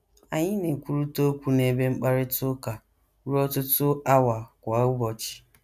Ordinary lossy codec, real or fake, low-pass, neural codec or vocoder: none; real; 14.4 kHz; none